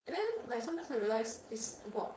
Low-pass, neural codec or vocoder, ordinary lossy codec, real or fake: none; codec, 16 kHz, 4.8 kbps, FACodec; none; fake